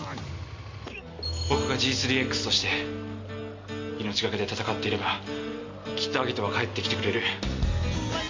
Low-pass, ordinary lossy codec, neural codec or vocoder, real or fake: 7.2 kHz; MP3, 48 kbps; none; real